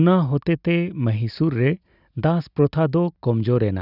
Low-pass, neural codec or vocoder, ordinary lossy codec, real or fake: 5.4 kHz; none; none; real